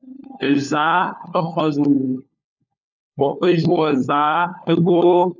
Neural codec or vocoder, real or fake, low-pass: codec, 16 kHz, 4 kbps, FunCodec, trained on LibriTTS, 50 frames a second; fake; 7.2 kHz